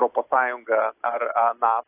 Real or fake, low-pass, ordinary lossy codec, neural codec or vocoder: real; 3.6 kHz; AAC, 24 kbps; none